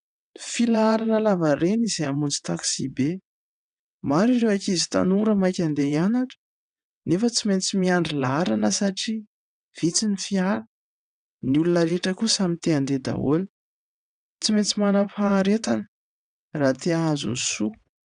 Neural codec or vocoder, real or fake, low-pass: vocoder, 22.05 kHz, 80 mel bands, WaveNeXt; fake; 9.9 kHz